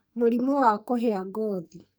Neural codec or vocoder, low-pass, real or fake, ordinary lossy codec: codec, 44.1 kHz, 2.6 kbps, SNAC; none; fake; none